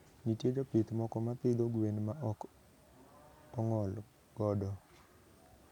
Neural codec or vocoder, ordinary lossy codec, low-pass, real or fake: none; none; 19.8 kHz; real